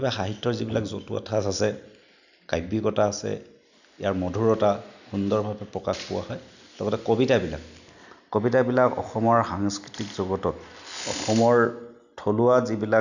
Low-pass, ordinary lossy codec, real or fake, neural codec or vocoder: 7.2 kHz; none; real; none